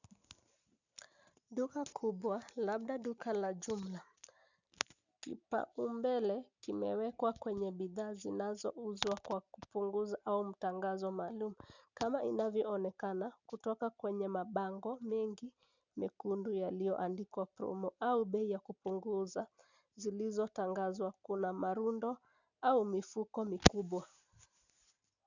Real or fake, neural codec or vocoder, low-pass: real; none; 7.2 kHz